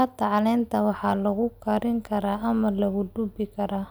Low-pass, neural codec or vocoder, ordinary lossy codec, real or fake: none; none; none; real